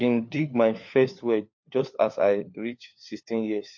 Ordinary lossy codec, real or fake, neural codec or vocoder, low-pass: MP3, 48 kbps; fake; codec, 16 kHz, 4 kbps, FunCodec, trained on LibriTTS, 50 frames a second; 7.2 kHz